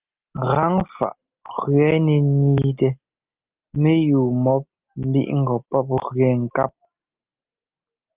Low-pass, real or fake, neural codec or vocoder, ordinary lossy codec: 3.6 kHz; real; none; Opus, 32 kbps